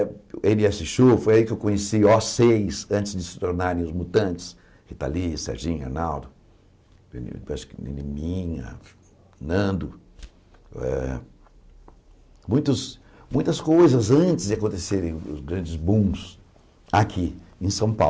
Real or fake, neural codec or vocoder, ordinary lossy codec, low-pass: real; none; none; none